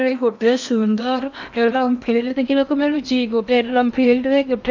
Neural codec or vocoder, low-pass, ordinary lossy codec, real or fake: codec, 16 kHz in and 24 kHz out, 0.8 kbps, FocalCodec, streaming, 65536 codes; 7.2 kHz; none; fake